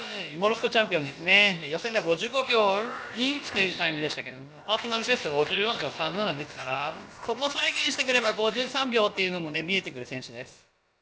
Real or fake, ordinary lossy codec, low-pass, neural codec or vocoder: fake; none; none; codec, 16 kHz, about 1 kbps, DyCAST, with the encoder's durations